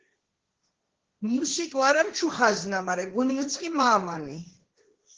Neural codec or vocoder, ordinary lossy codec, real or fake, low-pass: codec, 16 kHz, 1.1 kbps, Voila-Tokenizer; Opus, 16 kbps; fake; 7.2 kHz